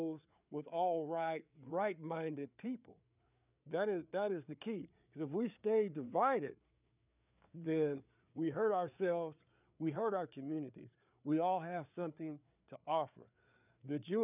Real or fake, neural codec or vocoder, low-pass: fake; codec, 16 kHz, 4 kbps, FreqCodec, larger model; 3.6 kHz